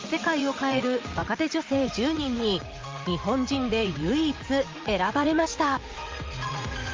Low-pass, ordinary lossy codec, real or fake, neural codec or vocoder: 7.2 kHz; Opus, 32 kbps; fake; vocoder, 44.1 kHz, 80 mel bands, Vocos